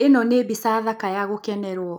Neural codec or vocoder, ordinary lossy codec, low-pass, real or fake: none; none; none; real